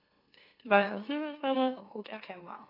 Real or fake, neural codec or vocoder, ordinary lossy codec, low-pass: fake; autoencoder, 44.1 kHz, a latent of 192 numbers a frame, MeloTTS; AAC, 24 kbps; 5.4 kHz